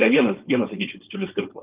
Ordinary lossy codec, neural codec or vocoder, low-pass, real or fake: Opus, 24 kbps; codec, 16 kHz, 4.8 kbps, FACodec; 3.6 kHz; fake